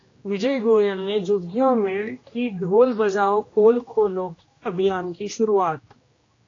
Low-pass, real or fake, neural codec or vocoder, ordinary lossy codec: 7.2 kHz; fake; codec, 16 kHz, 1 kbps, X-Codec, HuBERT features, trained on general audio; AAC, 32 kbps